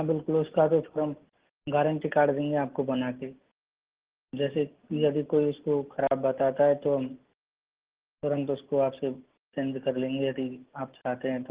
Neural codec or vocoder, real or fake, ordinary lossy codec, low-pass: none; real; Opus, 16 kbps; 3.6 kHz